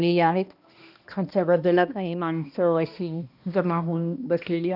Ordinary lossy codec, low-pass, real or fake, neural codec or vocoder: none; 5.4 kHz; fake; codec, 16 kHz, 1 kbps, X-Codec, HuBERT features, trained on balanced general audio